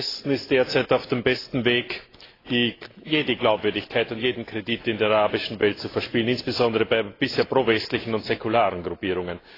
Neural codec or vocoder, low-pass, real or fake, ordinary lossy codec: none; 5.4 kHz; real; AAC, 24 kbps